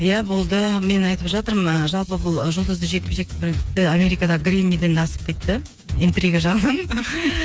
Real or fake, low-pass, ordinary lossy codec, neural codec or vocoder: fake; none; none; codec, 16 kHz, 4 kbps, FreqCodec, smaller model